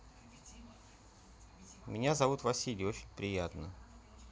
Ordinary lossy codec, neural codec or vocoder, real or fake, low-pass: none; none; real; none